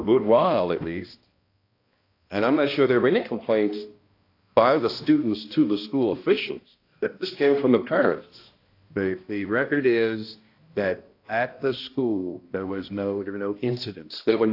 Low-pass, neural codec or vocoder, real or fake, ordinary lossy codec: 5.4 kHz; codec, 16 kHz, 1 kbps, X-Codec, HuBERT features, trained on balanced general audio; fake; AAC, 32 kbps